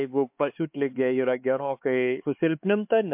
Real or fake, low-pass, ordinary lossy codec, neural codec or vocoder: fake; 3.6 kHz; MP3, 32 kbps; codec, 16 kHz, 4 kbps, X-Codec, HuBERT features, trained on LibriSpeech